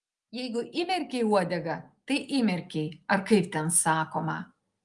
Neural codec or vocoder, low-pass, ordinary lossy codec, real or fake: none; 10.8 kHz; Opus, 32 kbps; real